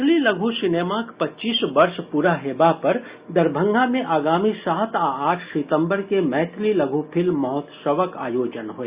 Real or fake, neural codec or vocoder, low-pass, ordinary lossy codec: real; none; 3.6 kHz; Opus, 64 kbps